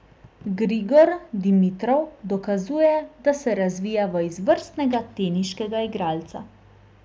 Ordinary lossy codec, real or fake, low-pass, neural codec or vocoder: none; real; none; none